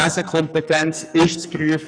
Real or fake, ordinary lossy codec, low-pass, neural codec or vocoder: fake; none; 9.9 kHz; codec, 44.1 kHz, 2.6 kbps, SNAC